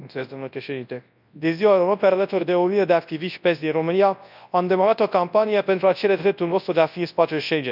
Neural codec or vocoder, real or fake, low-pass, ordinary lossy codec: codec, 24 kHz, 0.9 kbps, WavTokenizer, large speech release; fake; 5.4 kHz; none